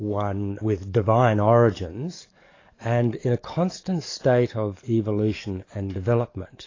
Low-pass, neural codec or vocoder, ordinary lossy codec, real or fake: 7.2 kHz; codec, 44.1 kHz, 7.8 kbps, DAC; AAC, 32 kbps; fake